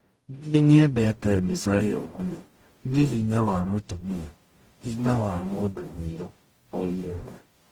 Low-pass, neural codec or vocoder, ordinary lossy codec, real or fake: 19.8 kHz; codec, 44.1 kHz, 0.9 kbps, DAC; Opus, 24 kbps; fake